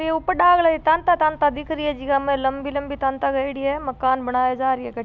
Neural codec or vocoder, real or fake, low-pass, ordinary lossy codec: none; real; none; none